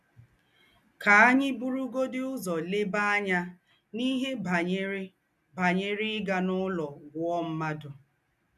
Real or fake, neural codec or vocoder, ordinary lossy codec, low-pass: real; none; none; 14.4 kHz